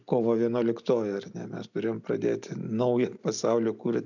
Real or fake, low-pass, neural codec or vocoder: real; 7.2 kHz; none